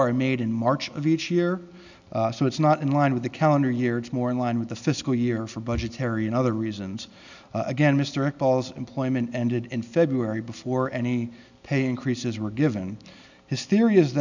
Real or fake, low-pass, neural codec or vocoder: real; 7.2 kHz; none